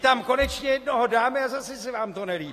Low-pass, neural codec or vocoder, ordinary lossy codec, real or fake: 14.4 kHz; none; AAC, 48 kbps; real